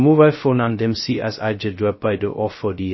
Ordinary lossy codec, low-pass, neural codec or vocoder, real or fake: MP3, 24 kbps; 7.2 kHz; codec, 16 kHz, 0.2 kbps, FocalCodec; fake